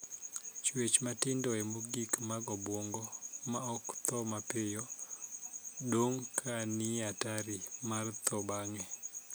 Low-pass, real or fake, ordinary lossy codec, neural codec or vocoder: none; real; none; none